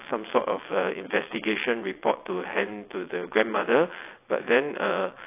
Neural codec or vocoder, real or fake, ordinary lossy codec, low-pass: vocoder, 22.05 kHz, 80 mel bands, WaveNeXt; fake; AAC, 24 kbps; 3.6 kHz